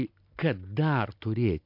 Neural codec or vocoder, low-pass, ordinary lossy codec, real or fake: none; 5.4 kHz; MP3, 48 kbps; real